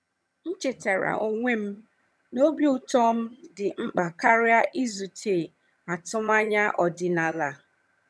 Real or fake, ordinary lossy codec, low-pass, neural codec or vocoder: fake; none; none; vocoder, 22.05 kHz, 80 mel bands, HiFi-GAN